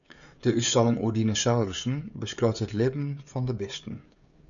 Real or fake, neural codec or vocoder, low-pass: fake; codec, 16 kHz, 16 kbps, FreqCodec, smaller model; 7.2 kHz